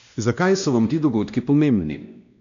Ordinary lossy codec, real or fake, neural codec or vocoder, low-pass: none; fake; codec, 16 kHz, 1 kbps, X-Codec, WavLM features, trained on Multilingual LibriSpeech; 7.2 kHz